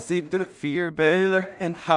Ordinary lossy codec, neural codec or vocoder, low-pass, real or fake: none; codec, 16 kHz in and 24 kHz out, 0.4 kbps, LongCat-Audio-Codec, two codebook decoder; 10.8 kHz; fake